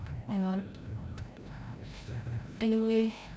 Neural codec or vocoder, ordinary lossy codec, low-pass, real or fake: codec, 16 kHz, 0.5 kbps, FreqCodec, larger model; none; none; fake